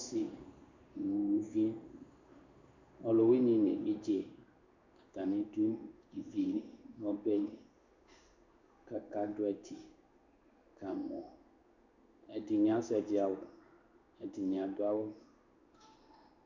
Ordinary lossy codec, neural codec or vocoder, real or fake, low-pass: Opus, 64 kbps; codec, 16 kHz in and 24 kHz out, 1 kbps, XY-Tokenizer; fake; 7.2 kHz